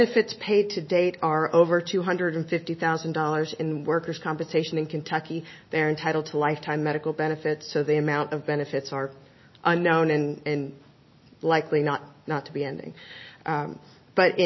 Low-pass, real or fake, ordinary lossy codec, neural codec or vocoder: 7.2 kHz; real; MP3, 24 kbps; none